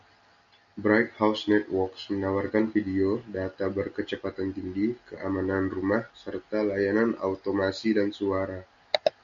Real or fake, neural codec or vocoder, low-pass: real; none; 7.2 kHz